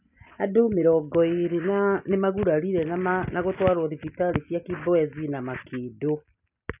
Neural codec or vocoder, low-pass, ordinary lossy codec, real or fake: none; 3.6 kHz; none; real